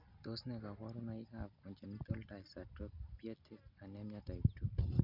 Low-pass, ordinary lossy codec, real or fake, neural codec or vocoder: 5.4 kHz; none; real; none